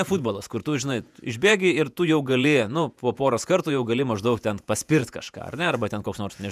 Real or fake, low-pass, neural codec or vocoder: fake; 14.4 kHz; vocoder, 48 kHz, 128 mel bands, Vocos